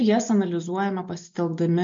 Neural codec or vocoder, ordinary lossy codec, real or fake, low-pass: none; MP3, 48 kbps; real; 7.2 kHz